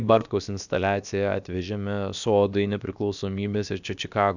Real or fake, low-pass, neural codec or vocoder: fake; 7.2 kHz; codec, 16 kHz, about 1 kbps, DyCAST, with the encoder's durations